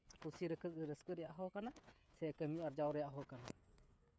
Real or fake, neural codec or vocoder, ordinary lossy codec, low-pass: fake; codec, 16 kHz, 16 kbps, FreqCodec, smaller model; none; none